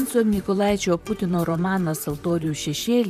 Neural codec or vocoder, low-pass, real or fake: vocoder, 44.1 kHz, 128 mel bands, Pupu-Vocoder; 14.4 kHz; fake